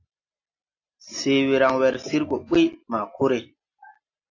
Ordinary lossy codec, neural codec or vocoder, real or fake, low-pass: AAC, 48 kbps; none; real; 7.2 kHz